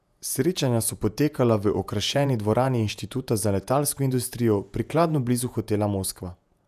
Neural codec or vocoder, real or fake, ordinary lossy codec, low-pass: vocoder, 48 kHz, 128 mel bands, Vocos; fake; none; 14.4 kHz